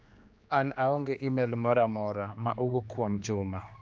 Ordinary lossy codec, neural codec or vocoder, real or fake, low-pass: none; codec, 16 kHz, 2 kbps, X-Codec, HuBERT features, trained on general audio; fake; none